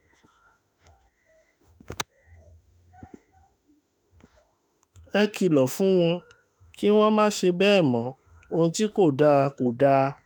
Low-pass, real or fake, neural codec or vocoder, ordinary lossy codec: none; fake; autoencoder, 48 kHz, 32 numbers a frame, DAC-VAE, trained on Japanese speech; none